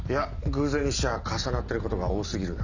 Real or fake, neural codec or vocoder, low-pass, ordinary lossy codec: real; none; 7.2 kHz; none